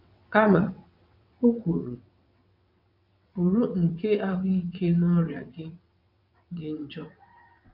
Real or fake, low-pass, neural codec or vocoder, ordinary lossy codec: fake; 5.4 kHz; vocoder, 22.05 kHz, 80 mel bands, WaveNeXt; none